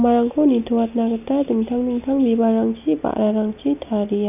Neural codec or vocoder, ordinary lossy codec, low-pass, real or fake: none; none; 3.6 kHz; real